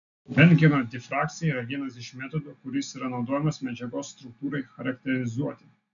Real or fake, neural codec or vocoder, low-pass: real; none; 7.2 kHz